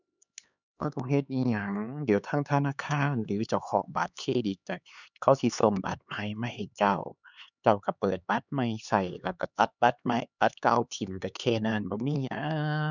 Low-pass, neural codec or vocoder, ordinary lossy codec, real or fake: 7.2 kHz; codec, 16 kHz, 4 kbps, X-Codec, HuBERT features, trained on LibriSpeech; none; fake